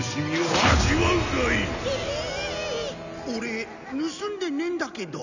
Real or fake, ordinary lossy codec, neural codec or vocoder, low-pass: real; none; none; 7.2 kHz